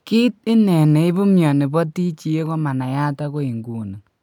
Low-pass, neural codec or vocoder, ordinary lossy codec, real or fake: 19.8 kHz; none; none; real